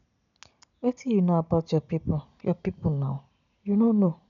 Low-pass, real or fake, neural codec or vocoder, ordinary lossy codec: 7.2 kHz; real; none; none